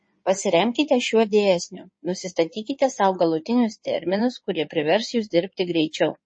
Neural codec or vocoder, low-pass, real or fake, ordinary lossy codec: codec, 16 kHz in and 24 kHz out, 2.2 kbps, FireRedTTS-2 codec; 9.9 kHz; fake; MP3, 32 kbps